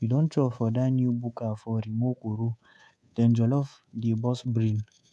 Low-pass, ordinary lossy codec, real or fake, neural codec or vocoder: none; none; fake; codec, 24 kHz, 3.1 kbps, DualCodec